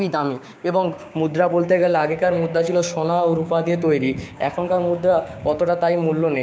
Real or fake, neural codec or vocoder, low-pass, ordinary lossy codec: fake; codec, 16 kHz, 6 kbps, DAC; none; none